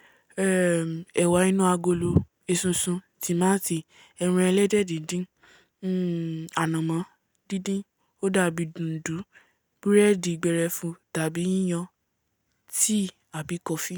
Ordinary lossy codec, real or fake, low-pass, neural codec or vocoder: none; real; none; none